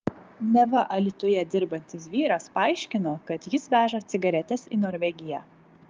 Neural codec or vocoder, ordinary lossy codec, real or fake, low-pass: codec, 16 kHz, 6 kbps, DAC; Opus, 24 kbps; fake; 7.2 kHz